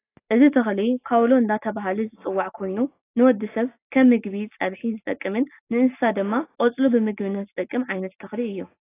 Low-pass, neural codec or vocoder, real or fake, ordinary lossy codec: 3.6 kHz; none; real; AAC, 24 kbps